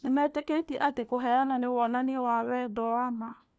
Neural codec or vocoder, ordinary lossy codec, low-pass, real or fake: codec, 16 kHz, 1 kbps, FunCodec, trained on LibriTTS, 50 frames a second; none; none; fake